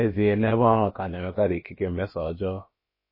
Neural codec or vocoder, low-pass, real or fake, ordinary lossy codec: codec, 16 kHz, about 1 kbps, DyCAST, with the encoder's durations; 5.4 kHz; fake; MP3, 24 kbps